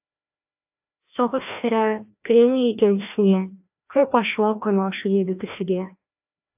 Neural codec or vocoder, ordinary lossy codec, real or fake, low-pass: codec, 16 kHz, 1 kbps, FreqCodec, larger model; AAC, 32 kbps; fake; 3.6 kHz